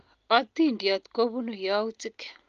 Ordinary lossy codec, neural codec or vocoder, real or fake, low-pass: Opus, 32 kbps; none; real; 7.2 kHz